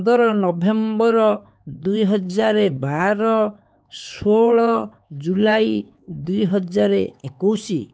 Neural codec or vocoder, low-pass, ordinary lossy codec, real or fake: codec, 16 kHz, 4 kbps, X-Codec, HuBERT features, trained on LibriSpeech; none; none; fake